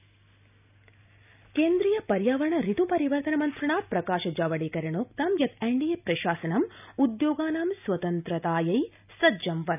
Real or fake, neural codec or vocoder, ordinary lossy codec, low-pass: real; none; none; 3.6 kHz